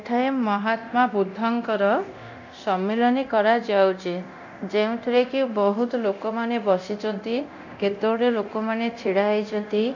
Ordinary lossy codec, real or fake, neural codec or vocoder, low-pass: none; fake; codec, 24 kHz, 0.9 kbps, DualCodec; 7.2 kHz